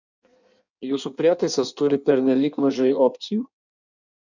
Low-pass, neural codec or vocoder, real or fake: 7.2 kHz; codec, 16 kHz in and 24 kHz out, 1.1 kbps, FireRedTTS-2 codec; fake